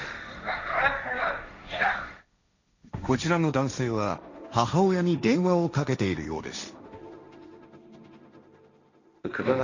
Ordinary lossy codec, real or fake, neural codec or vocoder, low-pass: none; fake; codec, 16 kHz, 1.1 kbps, Voila-Tokenizer; 7.2 kHz